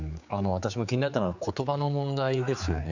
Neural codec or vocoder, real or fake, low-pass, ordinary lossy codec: codec, 16 kHz, 4 kbps, X-Codec, HuBERT features, trained on general audio; fake; 7.2 kHz; none